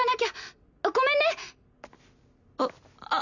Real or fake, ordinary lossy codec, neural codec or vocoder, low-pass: real; none; none; 7.2 kHz